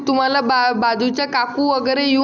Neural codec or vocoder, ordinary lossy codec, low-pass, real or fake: none; none; 7.2 kHz; real